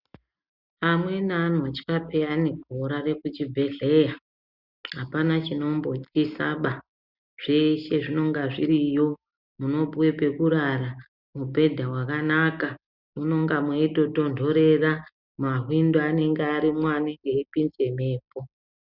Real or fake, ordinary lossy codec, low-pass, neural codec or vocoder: real; Opus, 64 kbps; 5.4 kHz; none